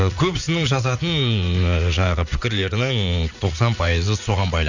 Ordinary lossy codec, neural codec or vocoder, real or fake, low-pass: none; vocoder, 44.1 kHz, 80 mel bands, Vocos; fake; 7.2 kHz